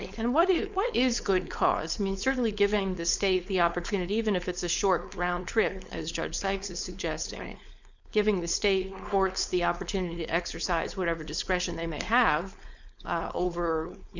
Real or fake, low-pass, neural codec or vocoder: fake; 7.2 kHz; codec, 16 kHz, 4.8 kbps, FACodec